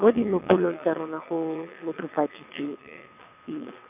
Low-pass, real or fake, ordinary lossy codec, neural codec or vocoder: 3.6 kHz; fake; none; vocoder, 22.05 kHz, 80 mel bands, WaveNeXt